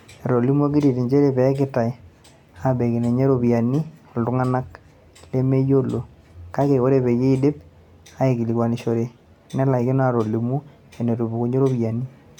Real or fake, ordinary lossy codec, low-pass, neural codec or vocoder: real; MP3, 96 kbps; 19.8 kHz; none